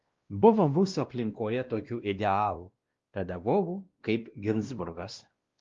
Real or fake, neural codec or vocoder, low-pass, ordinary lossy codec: fake; codec, 16 kHz, 1 kbps, X-Codec, WavLM features, trained on Multilingual LibriSpeech; 7.2 kHz; Opus, 32 kbps